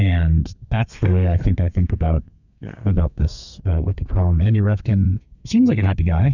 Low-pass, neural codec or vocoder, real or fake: 7.2 kHz; codec, 32 kHz, 1.9 kbps, SNAC; fake